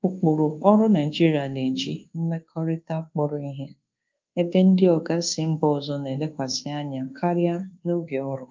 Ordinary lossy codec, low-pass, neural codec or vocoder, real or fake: Opus, 24 kbps; 7.2 kHz; codec, 24 kHz, 1.2 kbps, DualCodec; fake